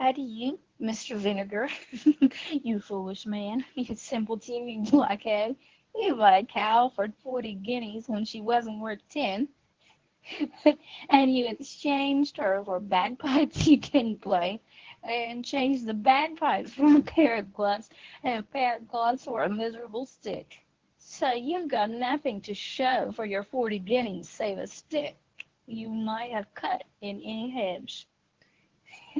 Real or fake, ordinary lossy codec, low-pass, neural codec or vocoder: fake; Opus, 16 kbps; 7.2 kHz; codec, 24 kHz, 0.9 kbps, WavTokenizer, medium speech release version 1